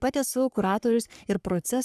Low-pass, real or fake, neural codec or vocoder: 14.4 kHz; fake; codec, 44.1 kHz, 3.4 kbps, Pupu-Codec